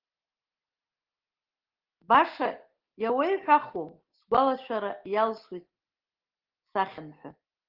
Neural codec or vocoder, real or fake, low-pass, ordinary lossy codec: none; real; 5.4 kHz; Opus, 16 kbps